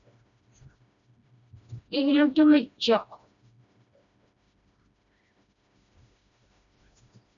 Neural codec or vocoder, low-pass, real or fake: codec, 16 kHz, 1 kbps, FreqCodec, smaller model; 7.2 kHz; fake